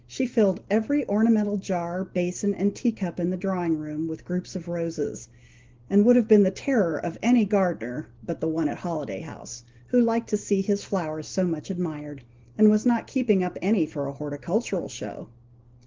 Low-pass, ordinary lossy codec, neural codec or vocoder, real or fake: 7.2 kHz; Opus, 16 kbps; none; real